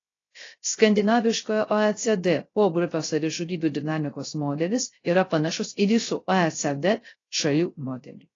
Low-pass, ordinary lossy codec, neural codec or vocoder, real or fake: 7.2 kHz; AAC, 32 kbps; codec, 16 kHz, 0.3 kbps, FocalCodec; fake